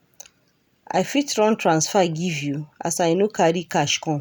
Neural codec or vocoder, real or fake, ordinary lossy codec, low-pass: none; real; none; none